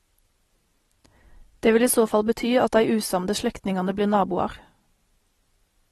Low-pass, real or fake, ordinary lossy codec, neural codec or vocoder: 19.8 kHz; real; AAC, 32 kbps; none